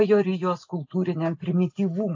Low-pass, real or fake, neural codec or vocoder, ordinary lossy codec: 7.2 kHz; real; none; AAC, 48 kbps